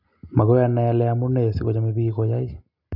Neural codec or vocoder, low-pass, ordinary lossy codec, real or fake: none; 5.4 kHz; none; real